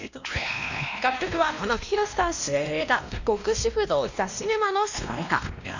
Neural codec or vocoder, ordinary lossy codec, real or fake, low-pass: codec, 16 kHz, 1 kbps, X-Codec, WavLM features, trained on Multilingual LibriSpeech; none; fake; 7.2 kHz